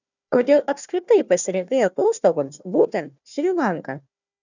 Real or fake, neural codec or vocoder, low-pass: fake; codec, 16 kHz, 1 kbps, FunCodec, trained on Chinese and English, 50 frames a second; 7.2 kHz